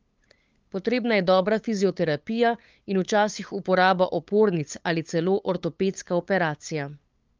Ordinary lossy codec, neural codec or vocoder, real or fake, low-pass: Opus, 24 kbps; codec, 16 kHz, 8 kbps, FunCodec, trained on LibriTTS, 25 frames a second; fake; 7.2 kHz